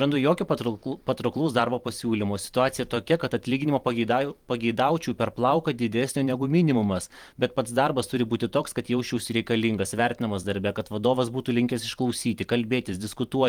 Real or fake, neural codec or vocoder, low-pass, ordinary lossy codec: fake; vocoder, 44.1 kHz, 128 mel bands every 512 samples, BigVGAN v2; 19.8 kHz; Opus, 24 kbps